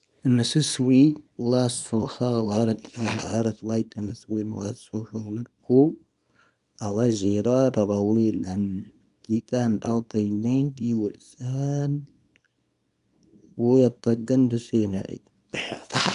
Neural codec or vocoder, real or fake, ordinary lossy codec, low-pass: codec, 24 kHz, 0.9 kbps, WavTokenizer, small release; fake; none; 10.8 kHz